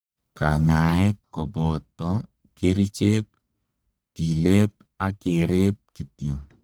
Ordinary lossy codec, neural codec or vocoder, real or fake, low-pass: none; codec, 44.1 kHz, 1.7 kbps, Pupu-Codec; fake; none